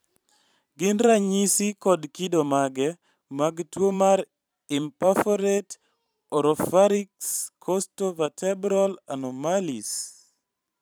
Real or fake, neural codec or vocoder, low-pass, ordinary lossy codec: fake; vocoder, 44.1 kHz, 128 mel bands every 512 samples, BigVGAN v2; none; none